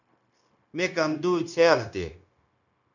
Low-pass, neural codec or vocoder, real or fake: 7.2 kHz; codec, 16 kHz, 0.9 kbps, LongCat-Audio-Codec; fake